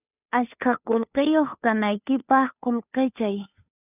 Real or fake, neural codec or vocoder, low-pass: fake; codec, 16 kHz, 2 kbps, FunCodec, trained on Chinese and English, 25 frames a second; 3.6 kHz